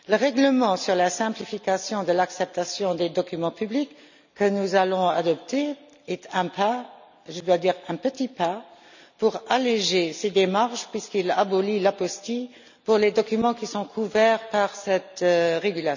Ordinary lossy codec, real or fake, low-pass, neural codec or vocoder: none; real; 7.2 kHz; none